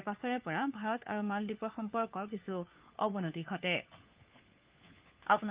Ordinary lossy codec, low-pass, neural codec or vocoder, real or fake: Opus, 24 kbps; 3.6 kHz; codec, 16 kHz, 4 kbps, FunCodec, trained on LibriTTS, 50 frames a second; fake